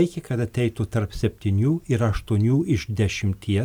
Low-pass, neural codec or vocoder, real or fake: 19.8 kHz; none; real